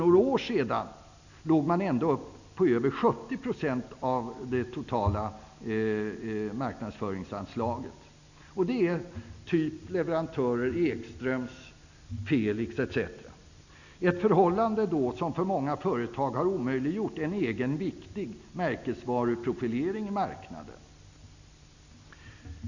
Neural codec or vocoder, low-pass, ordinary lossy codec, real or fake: none; 7.2 kHz; none; real